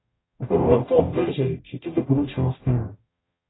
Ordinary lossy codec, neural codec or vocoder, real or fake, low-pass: AAC, 16 kbps; codec, 44.1 kHz, 0.9 kbps, DAC; fake; 7.2 kHz